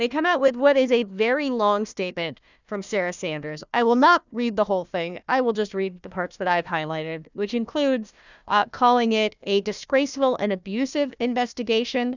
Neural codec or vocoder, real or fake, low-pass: codec, 16 kHz, 1 kbps, FunCodec, trained on Chinese and English, 50 frames a second; fake; 7.2 kHz